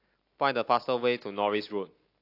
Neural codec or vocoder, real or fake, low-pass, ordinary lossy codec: none; real; 5.4 kHz; AAC, 32 kbps